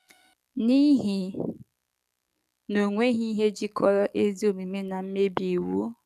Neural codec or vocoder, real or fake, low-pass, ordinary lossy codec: codec, 44.1 kHz, 7.8 kbps, DAC; fake; 14.4 kHz; none